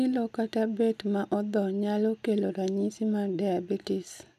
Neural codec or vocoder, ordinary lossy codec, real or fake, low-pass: none; none; real; 14.4 kHz